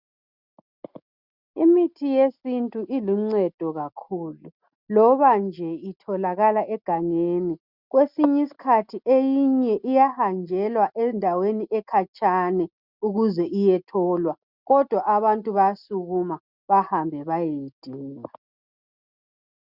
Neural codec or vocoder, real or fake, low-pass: none; real; 5.4 kHz